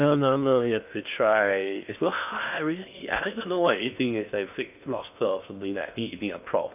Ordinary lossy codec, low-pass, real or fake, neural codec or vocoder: none; 3.6 kHz; fake; codec, 16 kHz in and 24 kHz out, 0.6 kbps, FocalCodec, streaming, 2048 codes